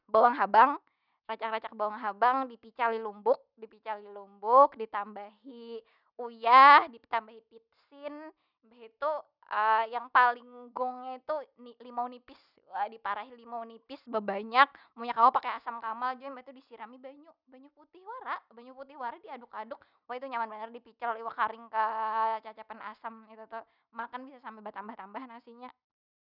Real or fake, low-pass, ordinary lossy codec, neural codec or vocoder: real; 5.4 kHz; none; none